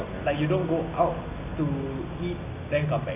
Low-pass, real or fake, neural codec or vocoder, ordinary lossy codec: 3.6 kHz; real; none; AAC, 24 kbps